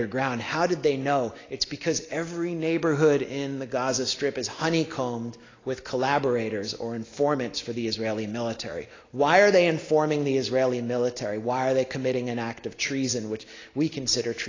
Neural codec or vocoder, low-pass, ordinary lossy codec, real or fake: none; 7.2 kHz; AAC, 32 kbps; real